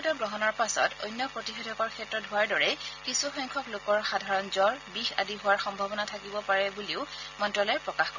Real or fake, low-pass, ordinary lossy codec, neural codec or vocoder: real; 7.2 kHz; none; none